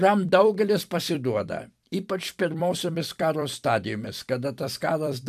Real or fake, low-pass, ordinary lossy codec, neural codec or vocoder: fake; 14.4 kHz; AAC, 96 kbps; vocoder, 44.1 kHz, 128 mel bands every 256 samples, BigVGAN v2